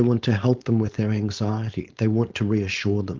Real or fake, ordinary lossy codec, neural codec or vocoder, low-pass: fake; Opus, 32 kbps; codec, 16 kHz, 4.8 kbps, FACodec; 7.2 kHz